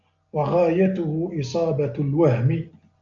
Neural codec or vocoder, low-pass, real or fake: none; 7.2 kHz; real